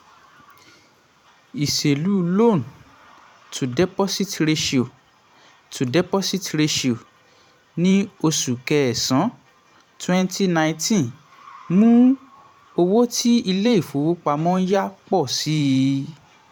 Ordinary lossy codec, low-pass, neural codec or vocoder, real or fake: none; 19.8 kHz; vocoder, 44.1 kHz, 128 mel bands every 512 samples, BigVGAN v2; fake